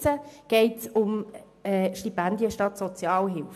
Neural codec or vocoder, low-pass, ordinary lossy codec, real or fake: none; 14.4 kHz; AAC, 96 kbps; real